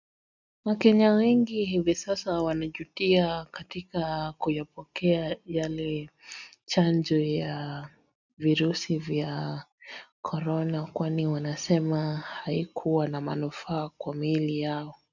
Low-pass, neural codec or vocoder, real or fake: 7.2 kHz; none; real